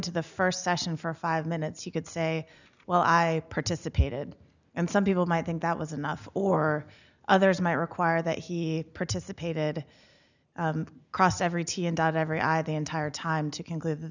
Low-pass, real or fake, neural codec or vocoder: 7.2 kHz; real; none